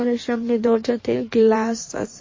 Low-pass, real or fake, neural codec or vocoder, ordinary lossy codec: 7.2 kHz; fake; codec, 16 kHz in and 24 kHz out, 1.1 kbps, FireRedTTS-2 codec; MP3, 32 kbps